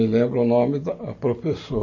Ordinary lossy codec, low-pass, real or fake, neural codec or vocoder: MP3, 32 kbps; 7.2 kHz; real; none